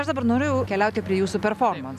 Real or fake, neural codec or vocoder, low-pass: real; none; 14.4 kHz